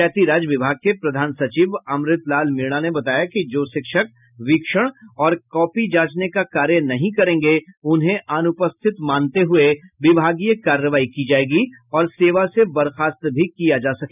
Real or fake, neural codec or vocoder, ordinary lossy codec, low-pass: real; none; none; 3.6 kHz